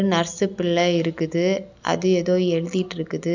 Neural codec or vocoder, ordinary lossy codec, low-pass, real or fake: none; none; 7.2 kHz; real